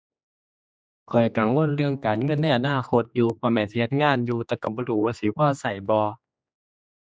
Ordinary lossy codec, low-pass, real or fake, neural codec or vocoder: none; none; fake; codec, 16 kHz, 2 kbps, X-Codec, HuBERT features, trained on general audio